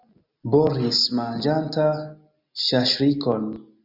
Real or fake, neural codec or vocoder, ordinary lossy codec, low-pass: real; none; Opus, 64 kbps; 5.4 kHz